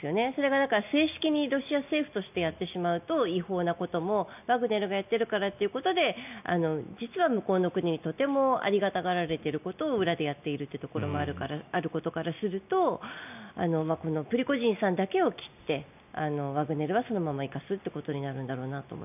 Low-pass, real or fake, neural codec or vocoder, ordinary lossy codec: 3.6 kHz; real; none; none